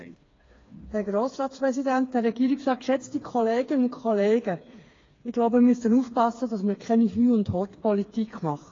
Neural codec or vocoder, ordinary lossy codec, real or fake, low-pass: codec, 16 kHz, 4 kbps, FreqCodec, smaller model; AAC, 32 kbps; fake; 7.2 kHz